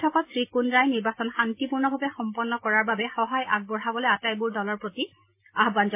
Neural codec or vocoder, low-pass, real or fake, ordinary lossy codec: none; 3.6 kHz; real; MP3, 24 kbps